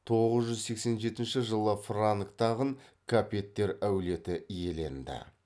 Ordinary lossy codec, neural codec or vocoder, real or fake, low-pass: none; none; real; none